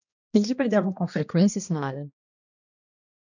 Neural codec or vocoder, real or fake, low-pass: codec, 16 kHz, 1 kbps, X-Codec, HuBERT features, trained on balanced general audio; fake; 7.2 kHz